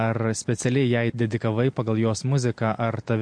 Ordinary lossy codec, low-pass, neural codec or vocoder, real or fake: MP3, 48 kbps; 9.9 kHz; none; real